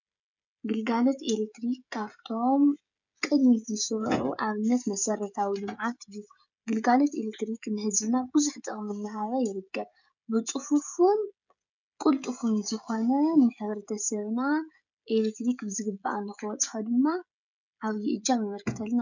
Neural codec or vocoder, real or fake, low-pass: codec, 16 kHz, 16 kbps, FreqCodec, smaller model; fake; 7.2 kHz